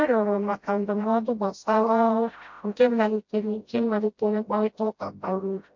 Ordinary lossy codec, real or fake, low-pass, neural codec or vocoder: MP3, 48 kbps; fake; 7.2 kHz; codec, 16 kHz, 0.5 kbps, FreqCodec, smaller model